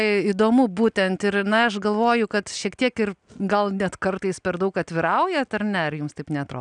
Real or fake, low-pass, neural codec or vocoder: real; 9.9 kHz; none